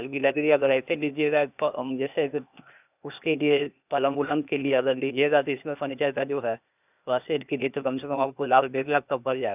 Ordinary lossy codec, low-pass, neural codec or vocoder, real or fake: none; 3.6 kHz; codec, 16 kHz, 0.8 kbps, ZipCodec; fake